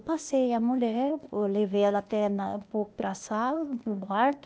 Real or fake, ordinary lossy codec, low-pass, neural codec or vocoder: fake; none; none; codec, 16 kHz, 0.8 kbps, ZipCodec